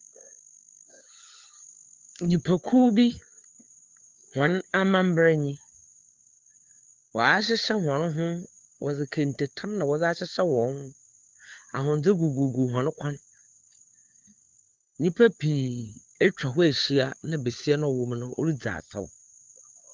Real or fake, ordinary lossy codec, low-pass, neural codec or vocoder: fake; Opus, 32 kbps; 7.2 kHz; codec, 16 kHz, 4 kbps, FunCodec, trained on LibriTTS, 50 frames a second